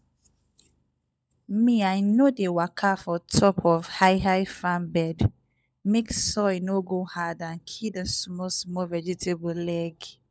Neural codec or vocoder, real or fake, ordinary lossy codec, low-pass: codec, 16 kHz, 16 kbps, FunCodec, trained on LibriTTS, 50 frames a second; fake; none; none